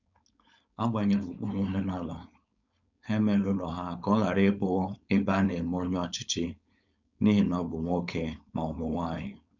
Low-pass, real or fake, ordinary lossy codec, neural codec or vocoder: 7.2 kHz; fake; none; codec, 16 kHz, 4.8 kbps, FACodec